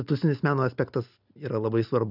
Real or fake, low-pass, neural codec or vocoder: real; 5.4 kHz; none